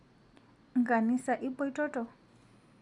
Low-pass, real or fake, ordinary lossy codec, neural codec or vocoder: 10.8 kHz; real; Opus, 64 kbps; none